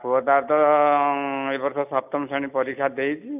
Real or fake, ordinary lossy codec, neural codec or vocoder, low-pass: real; none; none; 3.6 kHz